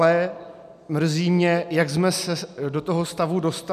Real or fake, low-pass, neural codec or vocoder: real; 14.4 kHz; none